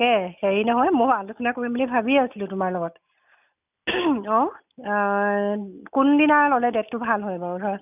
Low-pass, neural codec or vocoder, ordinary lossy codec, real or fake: 3.6 kHz; none; none; real